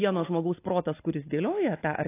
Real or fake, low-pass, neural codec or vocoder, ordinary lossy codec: real; 3.6 kHz; none; AAC, 24 kbps